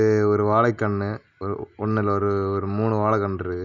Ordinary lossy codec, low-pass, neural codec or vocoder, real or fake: none; 7.2 kHz; none; real